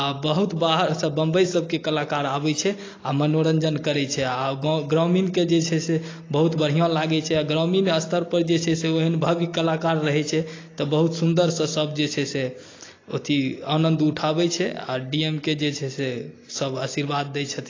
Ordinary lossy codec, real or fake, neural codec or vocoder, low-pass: AAC, 32 kbps; real; none; 7.2 kHz